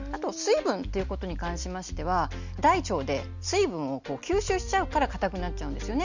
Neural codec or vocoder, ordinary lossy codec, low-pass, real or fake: none; none; 7.2 kHz; real